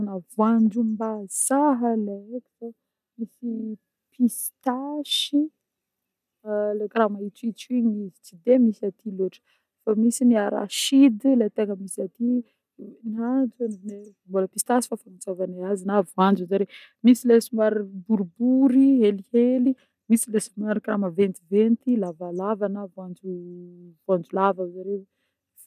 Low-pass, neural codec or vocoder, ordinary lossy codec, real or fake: 14.4 kHz; none; none; real